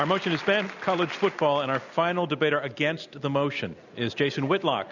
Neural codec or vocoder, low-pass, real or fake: none; 7.2 kHz; real